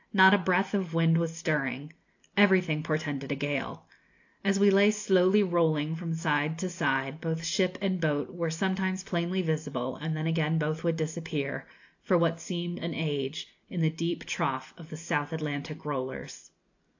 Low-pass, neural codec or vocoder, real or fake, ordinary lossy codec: 7.2 kHz; none; real; AAC, 48 kbps